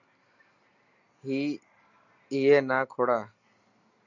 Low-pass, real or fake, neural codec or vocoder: 7.2 kHz; real; none